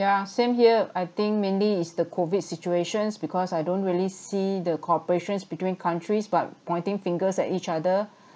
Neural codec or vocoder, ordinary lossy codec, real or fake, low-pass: none; none; real; none